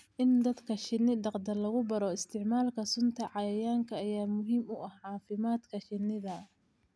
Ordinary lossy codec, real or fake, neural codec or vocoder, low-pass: none; real; none; none